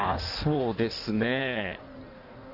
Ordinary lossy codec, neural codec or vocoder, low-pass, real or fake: none; codec, 16 kHz in and 24 kHz out, 1.1 kbps, FireRedTTS-2 codec; 5.4 kHz; fake